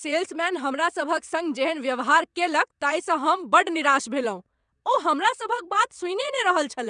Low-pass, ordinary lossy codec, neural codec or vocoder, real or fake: 9.9 kHz; none; vocoder, 22.05 kHz, 80 mel bands, WaveNeXt; fake